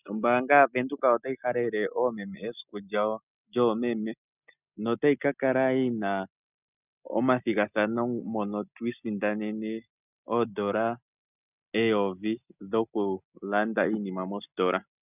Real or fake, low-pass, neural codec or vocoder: real; 3.6 kHz; none